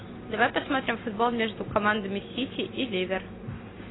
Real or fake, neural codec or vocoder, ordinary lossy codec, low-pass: real; none; AAC, 16 kbps; 7.2 kHz